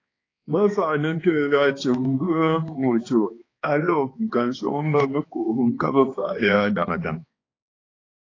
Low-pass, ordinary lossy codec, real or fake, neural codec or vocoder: 7.2 kHz; AAC, 32 kbps; fake; codec, 16 kHz, 2 kbps, X-Codec, HuBERT features, trained on balanced general audio